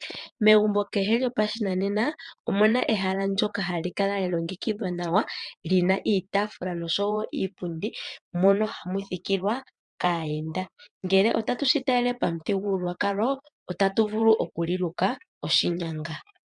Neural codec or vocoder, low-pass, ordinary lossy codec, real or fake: vocoder, 22.05 kHz, 80 mel bands, Vocos; 9.9 kHz; MP3, 96 kbps; fake